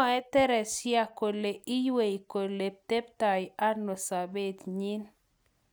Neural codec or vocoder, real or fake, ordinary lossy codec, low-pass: none; real; none; none